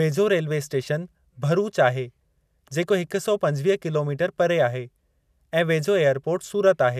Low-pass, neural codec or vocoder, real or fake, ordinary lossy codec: 14.4 kHz; none; real; none